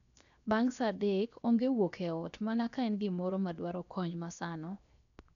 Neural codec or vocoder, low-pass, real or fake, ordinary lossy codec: codec, 16 kHz, 0.7 kbps, FocalCodec; 7.2 kHz; fake; none